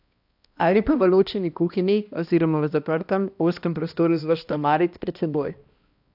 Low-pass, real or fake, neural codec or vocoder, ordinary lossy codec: 5.4 kHz; fake; codec, 16 kHz, 1 kbps, X-Codec, HuBERT features, trained on balanced general audio; AAC, 48 kbps